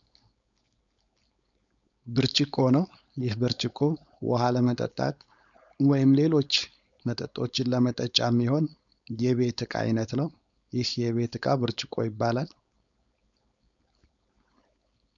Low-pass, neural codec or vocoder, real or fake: 7.2 kHz; codec, 16 kHz, 4.8 kbps, FACodec; fake